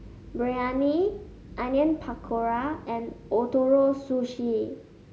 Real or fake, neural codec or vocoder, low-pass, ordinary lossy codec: real; none; none; none